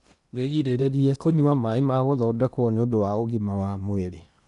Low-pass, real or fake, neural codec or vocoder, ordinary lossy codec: 10.8 kHz; fake; codec, 16 kHz in and 24 kHz out, 0.8 kbps, FocalCodec, streaming, 65536 codes; none